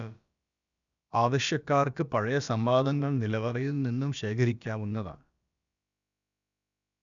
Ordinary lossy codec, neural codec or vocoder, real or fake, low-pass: none; codec, 16 kHz, about 1 kbps, DyCAST, with the encoder's durations; fake; 7.2 kHz